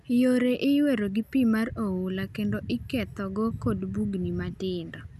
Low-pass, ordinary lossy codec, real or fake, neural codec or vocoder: 14.4 kHz; none; real; none